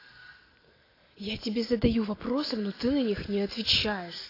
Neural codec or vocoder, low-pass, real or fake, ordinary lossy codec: none; 5.4 kHz; real; AAC, 24 kbps